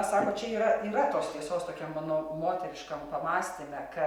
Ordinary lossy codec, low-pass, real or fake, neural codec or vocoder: Opus, 64 kbps; 19.8 kHz; real; none